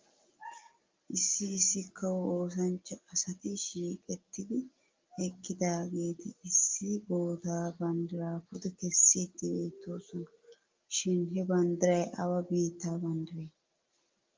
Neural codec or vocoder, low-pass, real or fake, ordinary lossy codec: none; 7.2 kHz; real; Opus, 24 kbps